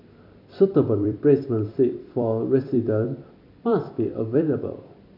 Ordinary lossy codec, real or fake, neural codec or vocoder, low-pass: MP3, 48 kbps; fake; vocoder, 44.1 kHz, 128 mel bands every 256 samples, BigVGAN v2; 5.4 kHz